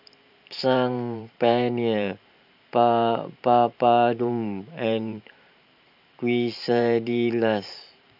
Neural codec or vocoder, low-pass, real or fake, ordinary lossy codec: vocoder, 44.1 kHz, 128 mel bands every 512 samples, BigVGAN v2; 5.4 kHz; fake; none